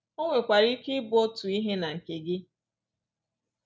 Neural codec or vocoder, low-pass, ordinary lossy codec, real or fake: none; none; none; real